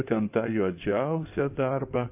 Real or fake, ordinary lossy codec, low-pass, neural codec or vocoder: fake; AAC, 32 kbps; 3.6 kHz; codec, 16 kHz in and 24 kHz out, 2.2 kbps, FireRedTTS-2 codec